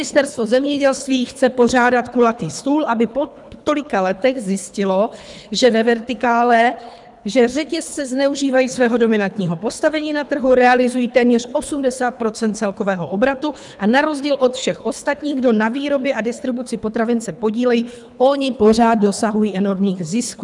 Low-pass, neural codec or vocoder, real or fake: 10.8 kHz; codec, 24 kHz, 3 kbps, HILCodec; fake